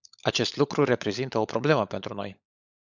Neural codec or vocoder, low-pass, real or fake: codec, 16 kHz, 16 kbps, FunCodec, trained on LibriTTS, 50 frames a second; 7.2 kHz; fake